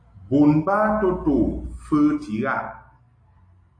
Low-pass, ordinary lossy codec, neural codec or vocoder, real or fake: 9.9 kHz; AAC, 48 kbps; none; real